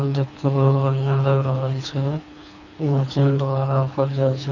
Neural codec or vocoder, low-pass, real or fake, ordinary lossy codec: codec, 24 kHz, 3 kbps, HILCodec; 7.2 kHz; fake; none